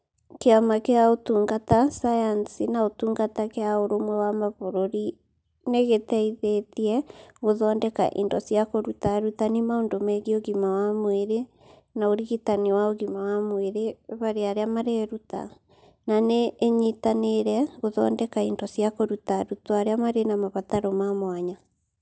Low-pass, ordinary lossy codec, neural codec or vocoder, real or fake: none; none; none; real